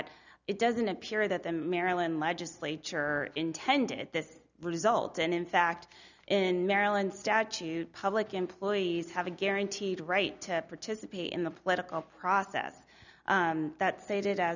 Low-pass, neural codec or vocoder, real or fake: 7.2 kHz; none; real